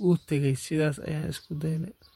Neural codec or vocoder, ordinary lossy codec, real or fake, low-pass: vocoder, 48 kHz, 128 mel bands, Vocos; MP3, 64 kbps; fake; 19.8 kHz